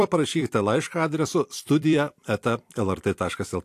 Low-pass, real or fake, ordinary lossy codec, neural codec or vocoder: 14.4 kHz; fake; AAC, 64 kbps; vocoder, 44.1 kHz, 128 mel bands every 256 samples, BigVGAN v2